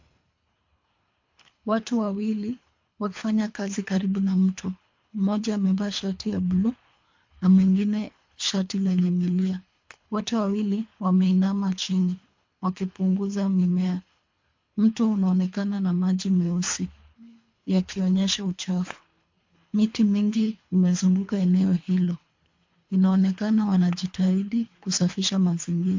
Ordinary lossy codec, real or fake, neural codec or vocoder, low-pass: MP3, 48 kbps; fake; codec, 24 kHz, 3 kbps, HILCodec; 7.2 kHz